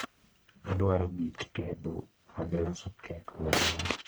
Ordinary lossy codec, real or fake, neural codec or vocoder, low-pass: none; fake; codec, 44.1 kHz, 1.7 kbps, Pupu-Codec; none